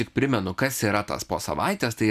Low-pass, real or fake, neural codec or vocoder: 14.4 kHz; real; none